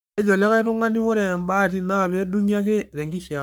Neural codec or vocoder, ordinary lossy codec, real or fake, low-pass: codec, 44.1 kHz, 3.4 kbps, Pupu-Codec; none; fake; none